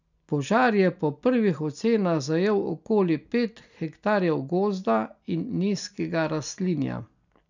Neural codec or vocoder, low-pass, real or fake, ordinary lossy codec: none; 7.2 kHz; real; none